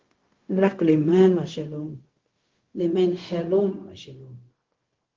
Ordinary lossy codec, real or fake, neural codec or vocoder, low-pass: Opus, 16 kbps; fake; codec, 16 kHz, 0.4 kbps, LongCat-Audio-Codec; 7.2 kHz